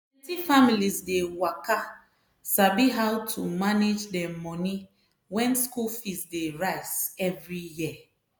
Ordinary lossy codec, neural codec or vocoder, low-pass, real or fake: none; none; none; real